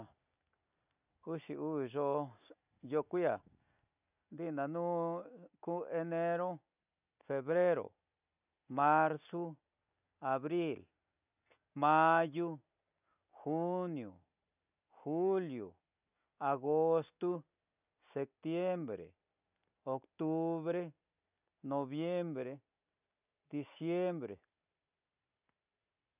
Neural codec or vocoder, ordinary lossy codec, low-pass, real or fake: none; none; 3.6 kHz; real